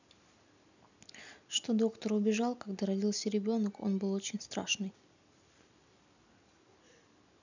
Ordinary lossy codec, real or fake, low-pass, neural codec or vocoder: none; real; 7.2 kHz; none